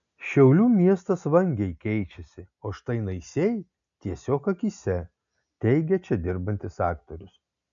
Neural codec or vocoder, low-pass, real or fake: none; 7.2 kHz; real